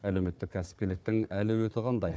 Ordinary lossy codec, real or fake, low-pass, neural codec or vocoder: none; fake; none; codec, 16 kHz, 4 kbps, FunCodec, trained on Chinese and English, 50 frames a second